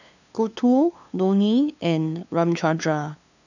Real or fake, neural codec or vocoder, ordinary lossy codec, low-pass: fake; codec, 16 kHz, 2 kbps, FunCodec, trained on LibriTTS, 25 frames a second; none; 7.2 kHz